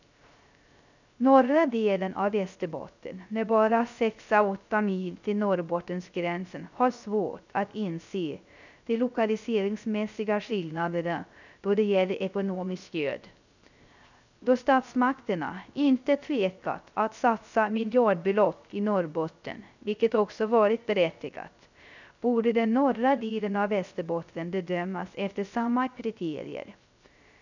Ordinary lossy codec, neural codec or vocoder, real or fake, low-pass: none; codec, 16 kHz, 0.3 kbps, FocalCodec; fake; 7.2 kHz